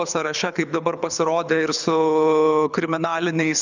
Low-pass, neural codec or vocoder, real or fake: 7.2 kHz; codec, 24 kHz, 6 kbps, HILCodec; fake